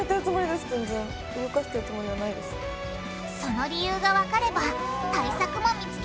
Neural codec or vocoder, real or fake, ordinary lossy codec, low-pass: none; real; none; none